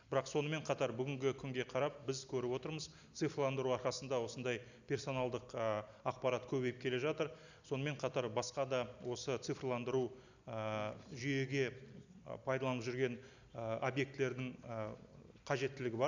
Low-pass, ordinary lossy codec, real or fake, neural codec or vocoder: 7.2 kHz; none; real; none